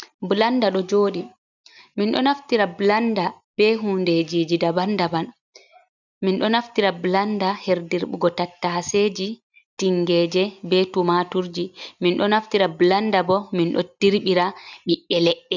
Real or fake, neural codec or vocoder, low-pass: real; none; 7.2 kHz